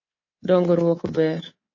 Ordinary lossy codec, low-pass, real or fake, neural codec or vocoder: MP3, 32 kbps; 7.2 kHz; fake; codec, 24 kHz, 3.1 kbps, DualCodec